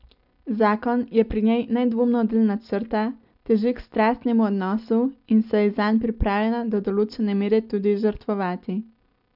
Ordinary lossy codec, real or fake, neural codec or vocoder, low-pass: none; real; none; 5.4 kHz